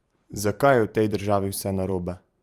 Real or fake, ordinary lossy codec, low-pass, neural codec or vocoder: fake; Opus, 32 kbps; 14.4 kHz; vocoder, 44.1 kHz, 128 mel bands every 512 samples, BigVGAN v2